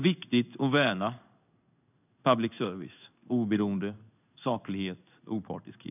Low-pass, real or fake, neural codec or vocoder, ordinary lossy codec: 3.6 kHz; fake; codec, 16 kHz in and 24 kHz out, 1 kbps, XY-Tokenizer; none